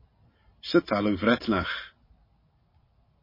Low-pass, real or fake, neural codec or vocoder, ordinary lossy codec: 5.4 kHz; real; none; MP3, 24 kbps